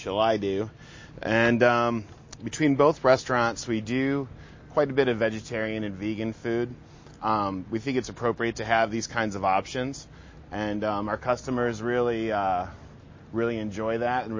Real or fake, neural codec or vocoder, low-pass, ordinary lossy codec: real; none; 7.2 kHz; MP3, 32 kbps